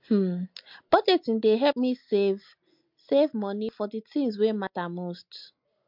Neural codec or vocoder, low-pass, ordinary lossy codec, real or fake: none; 5.4 kHz; MP3, 48 kbps; real